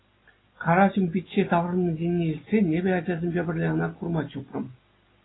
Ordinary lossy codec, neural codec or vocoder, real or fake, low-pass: AAC, 16 kbps; none; real; 7.2 kHz